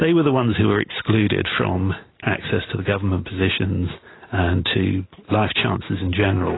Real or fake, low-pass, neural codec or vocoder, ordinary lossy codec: real; 7.2 kHz; none; AAC, 16 kbps